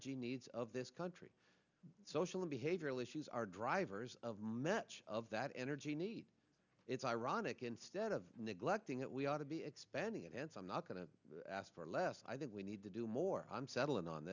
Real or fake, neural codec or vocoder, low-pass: real; none; 7.2 kHz